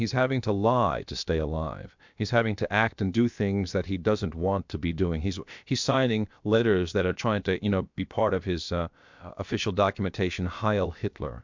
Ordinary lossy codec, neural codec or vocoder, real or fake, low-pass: MP3, 64 kbps; codec, 16 kHz, about 1 kbps, DyCAST, with the encoder's durations; fake; 7.2 kHz